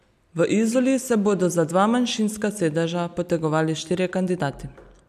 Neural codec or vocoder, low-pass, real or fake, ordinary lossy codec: none; 14.4 kHz; real; none